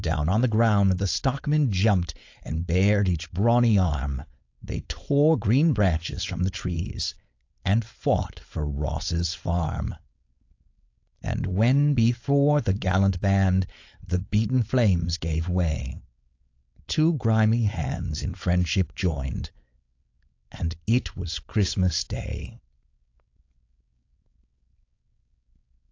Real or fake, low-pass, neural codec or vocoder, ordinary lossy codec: fake; 7.2 kHz; codec, 16 kHz, 4.8 kbps, FACodec; AAC, 48 kbps